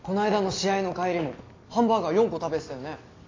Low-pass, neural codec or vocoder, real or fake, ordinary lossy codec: 7.2 kHz; none; real; AAC, 32 kbps